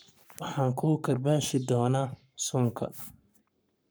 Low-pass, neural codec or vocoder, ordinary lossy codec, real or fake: none; codec, 44.1 kHz, 7.8 kbps, Pupu-Codec; none; fake